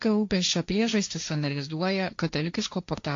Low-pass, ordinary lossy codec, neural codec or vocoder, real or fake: 7.2 kHz; AAC, 48 kbps; codec, 16 kHz, 1.1 kbps, Voila-Tokenizer; fake